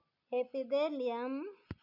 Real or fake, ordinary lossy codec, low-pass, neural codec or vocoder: real; none; 5.4 kHz; none